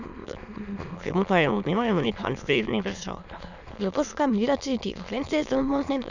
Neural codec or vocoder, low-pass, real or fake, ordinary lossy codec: autoencoder, 22.05 kHz, a latent of 192 numbers a frame, VITS, trained on many speakers; 7.2 kHz; fake; none